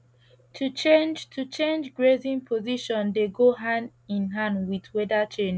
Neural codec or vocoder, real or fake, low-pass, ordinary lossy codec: none; real; none; none